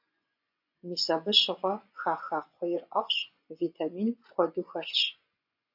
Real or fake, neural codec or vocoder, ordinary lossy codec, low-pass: fake; vocoder, 24 kHz, 100 mel bands, Vocos; AAC, 48 kbps; 5.4 kHz